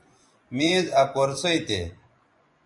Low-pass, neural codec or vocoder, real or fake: 10.8 kHz; vocoder, 24 kHz, 100 mel bands, Vocos; fake